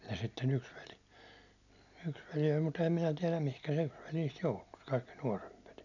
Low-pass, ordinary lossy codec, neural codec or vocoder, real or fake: 7.2 kHz; none; none; real